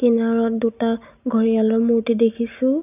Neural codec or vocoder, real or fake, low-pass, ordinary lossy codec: none; real; 3.6 kHz; none